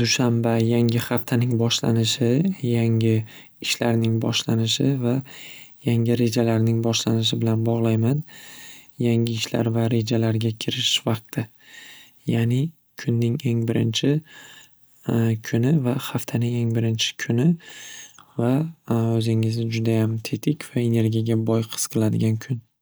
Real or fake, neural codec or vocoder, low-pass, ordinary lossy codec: real; none; none; none